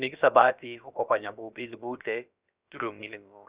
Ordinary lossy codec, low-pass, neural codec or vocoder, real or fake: Opus, 64 kbps; 3.6 kHz; codec, 16 kHz, about 1 kbps, DyCAST, with the encoder's durations; fake